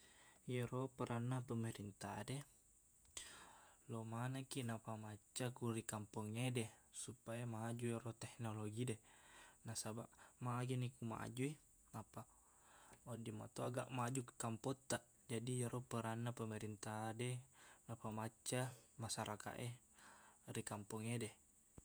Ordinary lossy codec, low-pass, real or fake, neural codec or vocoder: none; none; fake; vocoder, 48 kHz, 128 mel bands, Vocos